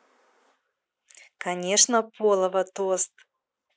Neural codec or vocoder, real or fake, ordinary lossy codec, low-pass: none; real; none; none